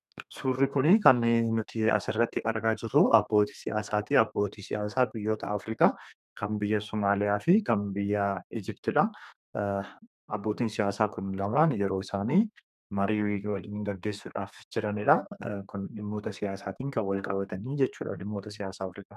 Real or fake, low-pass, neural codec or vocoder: fake; 14.4 kHz; codec, 32 kHz, 1.9 kbps, SNAC